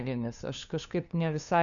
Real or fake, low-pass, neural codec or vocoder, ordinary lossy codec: fake; 7.2 kHz; codec, 16 kHz, 2 kbps, FunCodec, trained on LibriTTS, 25 frames a second; MP3, 96 kbps